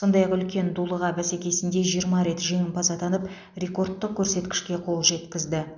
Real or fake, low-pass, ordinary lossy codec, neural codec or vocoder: real; 7.2 kHz; none; none